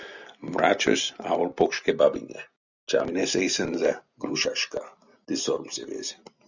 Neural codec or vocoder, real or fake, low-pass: none; real; 7.2 kHz